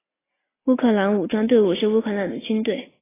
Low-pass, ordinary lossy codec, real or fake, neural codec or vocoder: 3.6 kHz; AAC, 16 kbps; real; none